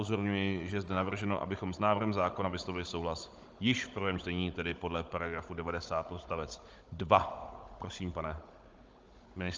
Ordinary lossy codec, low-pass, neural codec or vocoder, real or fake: Opus, 24 kbps; 7.2 kHz; codec, 16 kHz, 16 kbps, FunCodec, trained on Chinese and English, 50 frames a second; fake